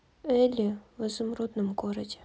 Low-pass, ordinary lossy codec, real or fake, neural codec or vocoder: none; none; real; none